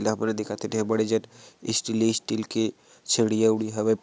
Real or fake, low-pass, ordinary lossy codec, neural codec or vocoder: real; none; none; none